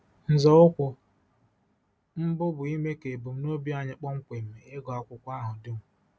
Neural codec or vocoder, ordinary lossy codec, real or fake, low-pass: none; none; real; none